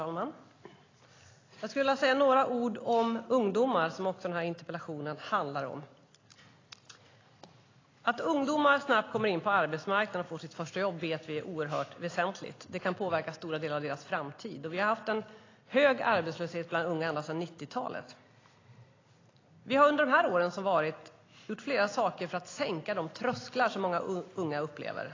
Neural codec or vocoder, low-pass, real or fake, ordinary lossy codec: none; 7.2 kHz; real; AAC, 32 kbps